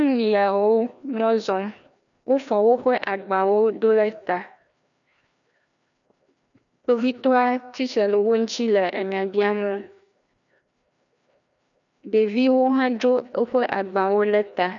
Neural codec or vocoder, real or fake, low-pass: codec, 16 kHz, 1 kbps, FreqCodec, larger model; fake; 7.2 kHz